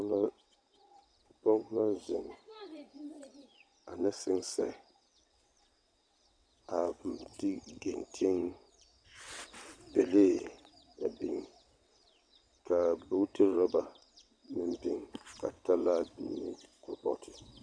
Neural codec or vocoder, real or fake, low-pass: vocoder, 22.05 kHz, 80 mel bands, WaveNeXt; fake; 9.9 kHz